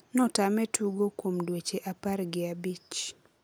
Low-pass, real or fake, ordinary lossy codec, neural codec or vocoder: none; real; none; none